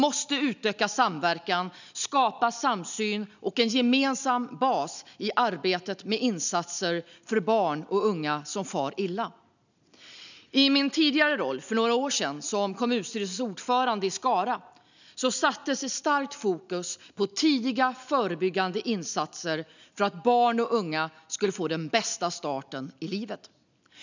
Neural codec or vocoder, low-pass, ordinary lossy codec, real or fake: none; 7.2 kHz; none; real